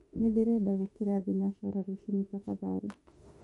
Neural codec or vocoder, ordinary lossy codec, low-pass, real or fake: autoencoder, 48 kHz, 32 numbers a frame, DAC-VAE, trained on Japanese speech; MP3, 48 kbps; 19.8 kHz; fake